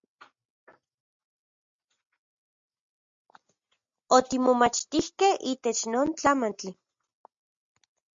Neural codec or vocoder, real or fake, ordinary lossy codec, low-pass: none; real; MP3, 48 kbps; 7.2 kHz